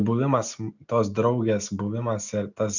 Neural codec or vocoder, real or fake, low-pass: none; real; 7.2 kHz